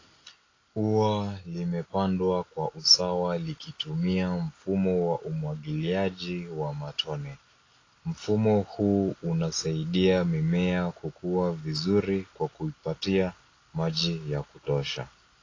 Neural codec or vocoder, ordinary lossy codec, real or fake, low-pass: none; AAC, 32 kbps; real; 7.2 kHz